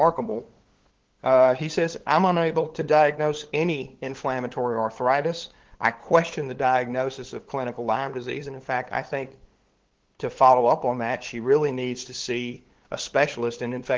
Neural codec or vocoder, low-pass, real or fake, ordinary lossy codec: codec, 16 kHz, 8 kbps, FunCodec, trained on LibriTTS, 25 frames a second; 7.2 kHz; fake; Opus, 16 kbps